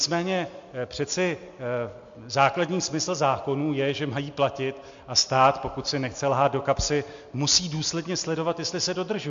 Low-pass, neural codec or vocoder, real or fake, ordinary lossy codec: 7.2 kHz; none; real; MP3, 48 kbps